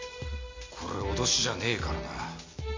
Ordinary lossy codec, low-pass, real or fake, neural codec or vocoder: MP3, 48 kbps; 7.2 kHz; real; none